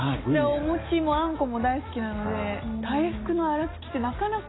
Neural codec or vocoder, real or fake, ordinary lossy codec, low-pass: none; real; AAC, 16 kbps; 7.2 kHz